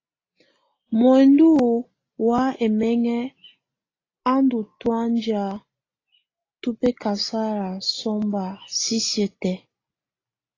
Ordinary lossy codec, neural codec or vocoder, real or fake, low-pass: AAC, 32 kbps; none; real; 7.2 kHz